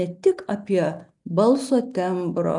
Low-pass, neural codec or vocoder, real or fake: 10.8 kHz; none; real